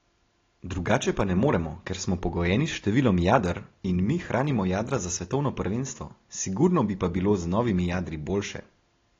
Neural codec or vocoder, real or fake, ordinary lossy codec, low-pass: none; real; AAC, 32 kbps; 7.2 kHz